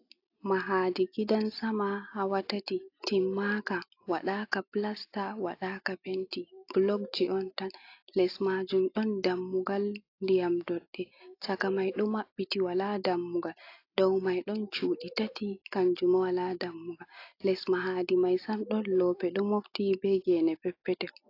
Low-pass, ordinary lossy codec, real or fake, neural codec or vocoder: 5.4 kHz; AAC, 32 kbps; real; none